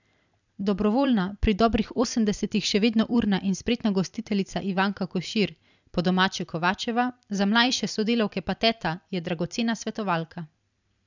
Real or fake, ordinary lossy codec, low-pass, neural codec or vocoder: fake; none; 7.2 kHz; vocoder, 44.1 kHz, 128 mel bands every 256 samples, BigVGAN v2